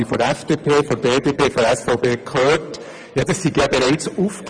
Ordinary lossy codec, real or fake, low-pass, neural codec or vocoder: none; fake; 9.9 kHz; vocoder, 24 kHz, 100 mel bands, Vocos